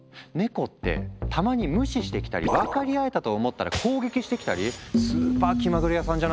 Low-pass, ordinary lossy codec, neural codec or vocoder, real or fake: none; none; none; real